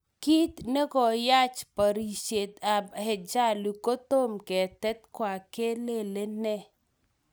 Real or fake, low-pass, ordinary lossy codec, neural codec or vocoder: real; none; none; none